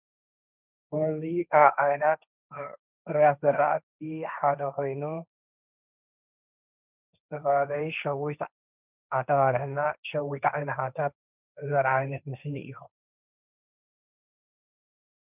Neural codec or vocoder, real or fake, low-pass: codec, 16 kHz, 1.1 kbps, Voila-Tokenizer; fake; 3.6 kHz